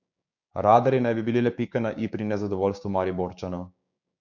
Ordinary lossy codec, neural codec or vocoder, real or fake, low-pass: none; codec, 16 kHz, 6 kbps, DAC; fake; 7.2 kHz